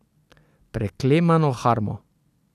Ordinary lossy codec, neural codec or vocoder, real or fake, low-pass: none; none; real; 14.4 kHz